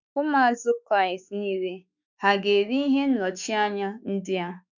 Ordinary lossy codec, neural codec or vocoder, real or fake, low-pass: none; autoencoder, 48 kHz, 32 numbers a frame, DAC-VAE, trained on Japanese speech; fake; 7.2 kHz